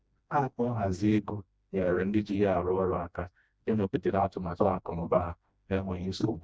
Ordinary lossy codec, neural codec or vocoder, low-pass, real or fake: none; codec, 16 kHz, 1 kbps, FreqCodec, smaller model; none; fake